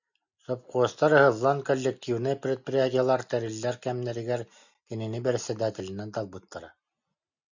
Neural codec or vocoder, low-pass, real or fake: none; 7.2 kHz; real